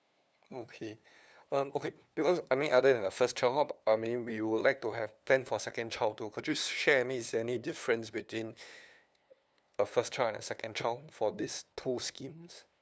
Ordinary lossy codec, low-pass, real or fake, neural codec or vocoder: none; none; fake; codec, 16 kHz, 2 kbps, FunCodec, trained on LibriTTS, 25 frames a second